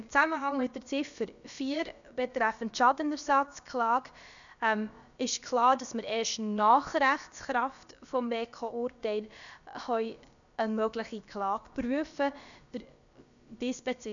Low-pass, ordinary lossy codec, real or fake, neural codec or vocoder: 7.2 kHz; none; fake; codec, 16 kHz, about 1 kbps, DyCAST, with the encoder's durations